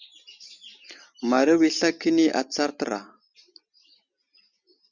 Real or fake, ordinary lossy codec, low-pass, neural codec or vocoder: real; Opus, 64 kbps; 7.2 kHz; none